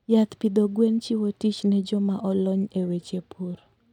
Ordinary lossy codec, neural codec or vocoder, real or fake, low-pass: none; none; real; 19.8 kHz